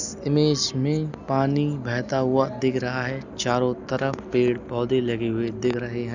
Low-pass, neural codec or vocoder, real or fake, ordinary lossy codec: 7.2 kHz; none; real; none